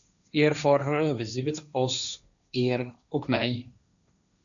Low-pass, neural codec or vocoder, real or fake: 7.2 kHz; codec, 16 kHz, 1.1 kbps, Voila-Tokenizer; fake